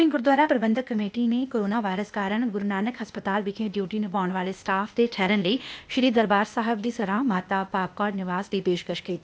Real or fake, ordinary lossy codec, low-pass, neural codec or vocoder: fake; none; none; codec, 16 kHz, 0.8 kbps, ZipCodec